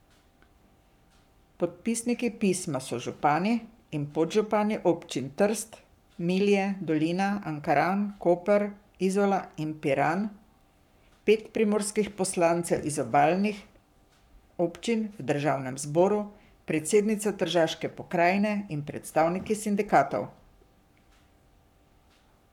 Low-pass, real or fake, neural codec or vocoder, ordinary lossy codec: 19.8 kHz; fake; codec, 44.1 kHz, 7.8 kbps, Pupu-Codec; none